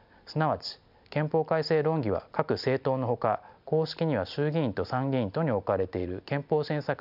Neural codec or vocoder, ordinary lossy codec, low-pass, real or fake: none; none; 5.4 kHz; real